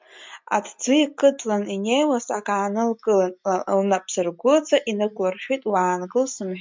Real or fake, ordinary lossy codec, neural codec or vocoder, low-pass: real; MP3, 64 kbps; none; 7.2 kHz